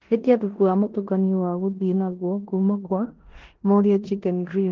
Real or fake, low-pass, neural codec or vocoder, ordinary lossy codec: fake; 7.2 kHz; codec, 16 kHz in and 24 kHz out, 0.9 kbps, LongCat-Audio-Codec, four codebook decoder; Opus, 16 kbps